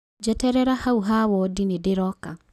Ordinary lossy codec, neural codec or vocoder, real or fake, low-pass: none; none; real; 14.4 kHz